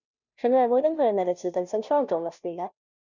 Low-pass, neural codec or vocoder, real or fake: 7.2 kHz; codec, 16 kHz, 0.5 kbps, FunCodec, trained on Chinese and English, 25 frames a second; fake